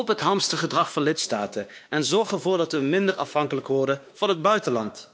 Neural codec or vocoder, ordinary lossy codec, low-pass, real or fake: codec, 16 kHz, 2 kbps, X-Codec, WavLM features, trained on Multilingual LibriSpeech; none; none; fake